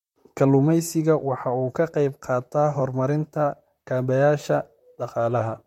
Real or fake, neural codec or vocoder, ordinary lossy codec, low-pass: fake; vocoder, 44.1 kHz, 128 mel bands, Pupu-Vocoder; MP3, 64 kbps; 19.8 kHz